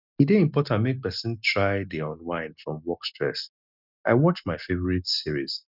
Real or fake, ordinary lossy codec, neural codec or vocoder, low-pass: real; none; none; 5.4 kHz